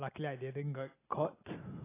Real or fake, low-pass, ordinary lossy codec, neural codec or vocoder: real; 3.6 kHz; AAC, 16 kbps; none